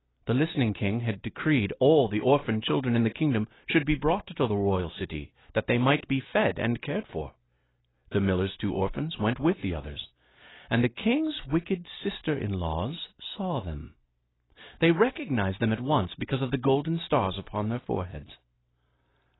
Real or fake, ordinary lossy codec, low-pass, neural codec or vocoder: real; AAC, 16 kbps; 7.2 kHz; none